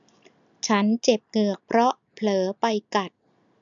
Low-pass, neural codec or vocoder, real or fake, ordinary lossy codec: 7.2 kHz; none; real; none